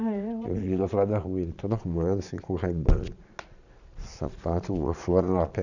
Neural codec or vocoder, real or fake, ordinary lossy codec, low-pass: vocoder, 22.05 kHz, 80 mel bands, Vocos; fake; none; 7.2 kHz